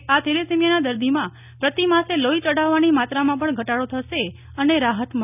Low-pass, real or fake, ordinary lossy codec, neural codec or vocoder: 3.6 kHz; real; none; none